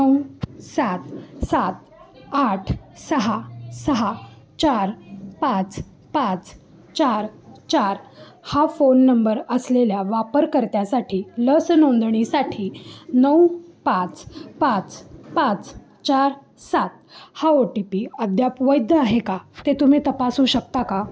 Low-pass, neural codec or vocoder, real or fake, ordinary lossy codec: none; none; real; none